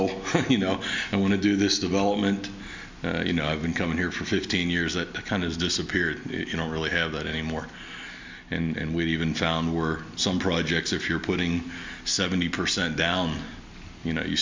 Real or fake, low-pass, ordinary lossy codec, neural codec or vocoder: real; 7.2 kHz; MP3, 64 kbps; none